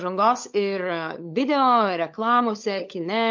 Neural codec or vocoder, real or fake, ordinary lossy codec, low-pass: codec, 16 kHz, 16 kbps, FunCodec, trained on LibriTTS, 50 frames a second; fake; MP3, 48 kbps; 7.2 kHz